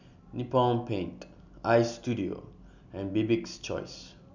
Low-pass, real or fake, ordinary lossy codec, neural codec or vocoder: 7.2 kHz; real; none; none